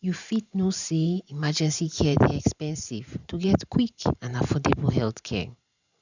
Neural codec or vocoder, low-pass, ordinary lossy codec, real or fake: vocoder, 44.1 kHz, 128 mel bands every 512 samples, BigVGAN v2; 7.2 kHz; none; fake